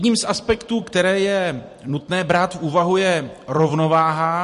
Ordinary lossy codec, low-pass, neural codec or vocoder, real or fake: MP3, 48 kbps; 14.4 kHz; vocoder, 44.1 kHz, 128 mel bands every 256 samples, BigVGAN v2; fake